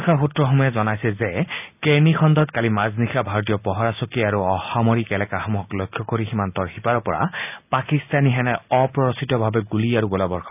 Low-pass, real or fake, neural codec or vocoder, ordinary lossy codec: 3.6 kHz; real; none; none